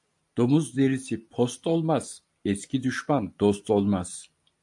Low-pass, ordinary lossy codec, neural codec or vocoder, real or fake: 10.8 kHz; AAC, 64 kbps; none; real